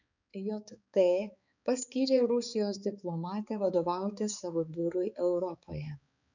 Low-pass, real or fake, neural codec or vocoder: 7.2 kHz; fake; codec, 16 kHz, 4 kbps, X-Codec, HuBERT features, trained on balanced general audio